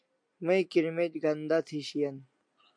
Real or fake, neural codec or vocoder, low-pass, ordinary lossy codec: real; none; 9.9 kHz; MP3, 64 kbps